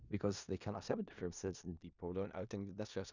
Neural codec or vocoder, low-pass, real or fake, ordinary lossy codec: codec, 16 kHz in and 24 kHz out, 0.4 kbps, LongCat-Audio-Codec, four codebook decoder; 7.2 kHz; fake; none